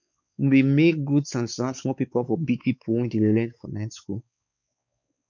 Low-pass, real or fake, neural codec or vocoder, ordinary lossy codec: 7.2 kHz; fake; codec, 16 kHz, 4 kbps, X-Codec, WavLM features, trained on Multilingual LibriSpeech; none